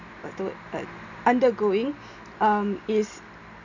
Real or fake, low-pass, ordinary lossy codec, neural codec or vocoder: real; 7.2 kHz; none; none